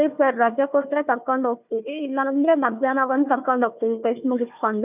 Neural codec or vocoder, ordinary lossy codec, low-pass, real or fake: codec, 16 kHz, 1 kbps, FunCodec, trained on Chinese and English, 50 frames a second; none; 3.6 kHz; fake